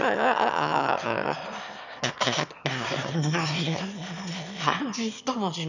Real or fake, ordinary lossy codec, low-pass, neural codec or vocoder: fake; none; 7.2 kHz; autoencoder, 22.05 kHz, a latent of 192 numbers a frame, VITS, trained on one speaker